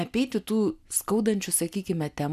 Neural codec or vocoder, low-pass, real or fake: none; 14.4 kHz; real